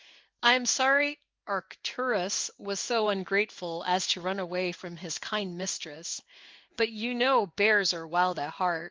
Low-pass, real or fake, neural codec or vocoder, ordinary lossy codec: 7.2 kHz; fake; codec, 16 kHz in and 24 kHz out, 1 kbps, XY-Tokenizer; Opus, 32 kbps